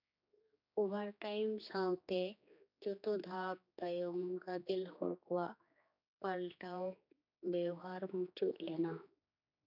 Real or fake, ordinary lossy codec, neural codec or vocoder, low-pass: fake; none; codec, 16 kHz, 2 kbps, X-Codec, HuBERT features, trained on general audio; 5.4 kHz